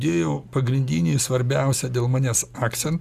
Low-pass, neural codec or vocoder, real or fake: 14.4 kHz; none; real